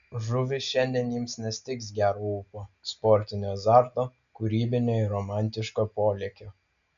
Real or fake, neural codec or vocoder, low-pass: real; none; 7.2 kHz